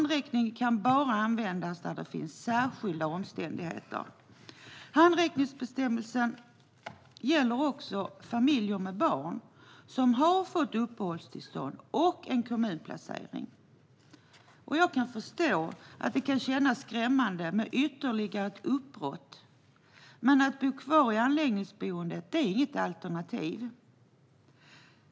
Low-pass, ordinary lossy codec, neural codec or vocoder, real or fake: none; none; none; real